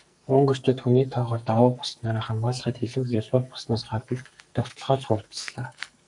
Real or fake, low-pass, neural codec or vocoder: fake; 10.8 kHz; codec, 44.1 kHz, 2.6 kbps, SNAC